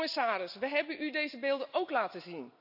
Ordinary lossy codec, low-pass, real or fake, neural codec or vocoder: AAC, 48 kbps; 5.4 kHz; real; none